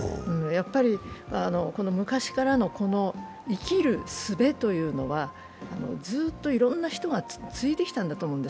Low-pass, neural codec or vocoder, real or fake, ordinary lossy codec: none; none; real; none